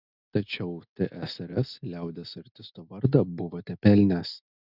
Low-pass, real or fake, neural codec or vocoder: 5.4 kHz; real; none